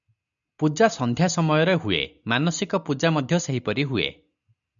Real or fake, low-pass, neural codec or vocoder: real; 7.2 kHz; none